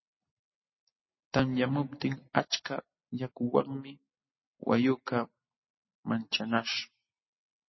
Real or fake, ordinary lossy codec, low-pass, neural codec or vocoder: real; MP3, 24 kbps; 7.2 kHz; none